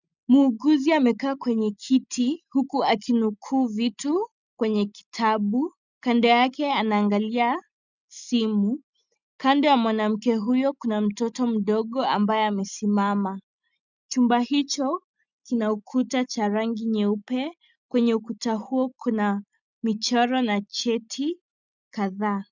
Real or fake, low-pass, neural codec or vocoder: real; 7.2 kHz; none